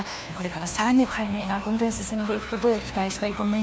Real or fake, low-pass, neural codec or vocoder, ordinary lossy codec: fake; none; codec, 16 kHz, 1 kbps, FunCodec, trained on LibriTTS, 50 frames a second; none